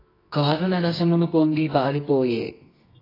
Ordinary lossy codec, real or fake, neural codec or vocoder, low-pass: AAC, 24 kbps; fake; codec, 24 kHz, 0.9 kbps, WavTokenizer, medium music audio release; 5.4 kHz